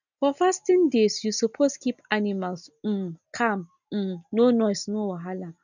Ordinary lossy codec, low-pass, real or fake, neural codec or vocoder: none; 7.2 kHz; real; none